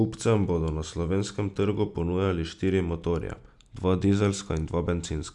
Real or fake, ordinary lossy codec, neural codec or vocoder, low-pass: real; none; none; 10.8 kHz